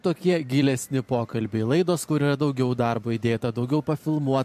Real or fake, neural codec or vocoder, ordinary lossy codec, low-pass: fake; vocoder, 44.1 kHz, 128 mel bands every 512 samples, BigVGAN v2; MP3, 64 kbps; 14.4 kHz